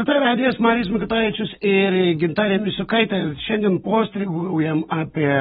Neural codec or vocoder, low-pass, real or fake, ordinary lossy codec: none; 19.8 kHz; real; AAC, 16 kbps